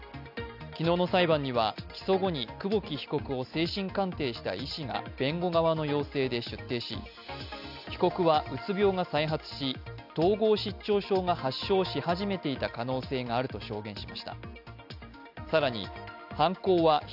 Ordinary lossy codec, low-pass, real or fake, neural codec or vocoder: none; 5.4 kHz; real; none